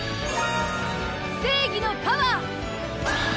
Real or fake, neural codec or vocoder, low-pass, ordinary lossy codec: real; none; none; none